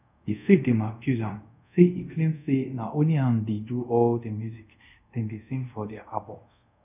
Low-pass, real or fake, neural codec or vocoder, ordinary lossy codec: 3.6 kHz; fake; codec, 24 kHz, 0.5 kbps, DualCodec; none